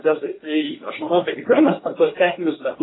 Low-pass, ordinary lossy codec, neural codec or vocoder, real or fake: 7.2 kHz; AAC, 16 kbps; codec, 24 kHz, 1 kbps, SNAC; fake